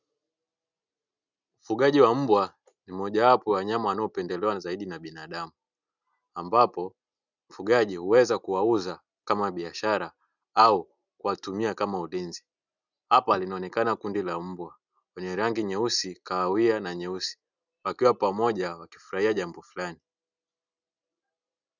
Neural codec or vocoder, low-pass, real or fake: none; 7.2 kHz; real